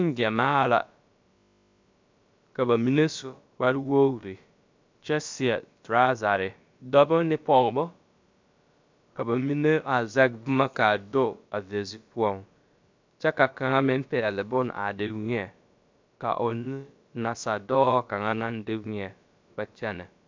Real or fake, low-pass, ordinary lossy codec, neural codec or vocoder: fake; 7.2 kHz; MP3, 64 kbps; codec, 16 kHz, about 1 kbps, DyCAST, with the encoder's durations